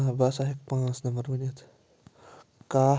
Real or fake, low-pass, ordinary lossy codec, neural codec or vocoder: real; none; none; none